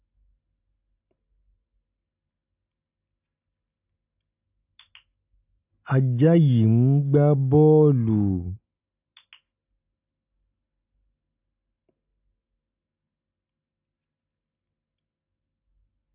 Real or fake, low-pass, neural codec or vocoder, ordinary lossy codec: real; 3.6 kHz; none; AAC, 32 kbps